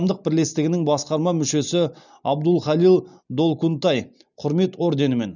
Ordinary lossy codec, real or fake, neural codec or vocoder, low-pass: none; real; none; 7.2 kHz